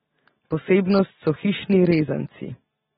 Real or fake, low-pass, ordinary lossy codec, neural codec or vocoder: real; 19.8 kHz; AAC, 16 kbps; none